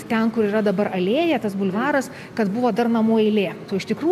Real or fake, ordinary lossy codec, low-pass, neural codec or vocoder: real; MP3, 96 kbps; 14.4 kHz; none